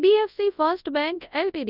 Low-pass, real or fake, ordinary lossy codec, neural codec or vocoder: 5.4 kHz; fake; none; codec, 24 kHz, 0.9 kbps, WavTokenizer, large speech release